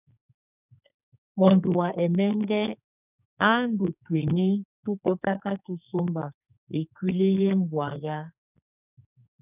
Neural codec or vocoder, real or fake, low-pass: codec, 44.1 kHz, 2.6 kbps, SNAC; fake; 3.6 kHz